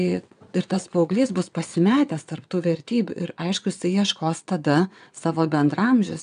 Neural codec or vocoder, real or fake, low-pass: vocoder, 22.05 kHz, 80 mel bands, WaveNeXt; fake; 9.9 kHz